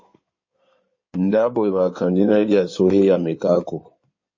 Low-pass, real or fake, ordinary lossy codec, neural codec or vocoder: 7.2 kHz; fake; MP3, 32 kbps; codec, 16 kHz in and 24 kHz out, 2.2 kbps, FireRedTTS-2 codec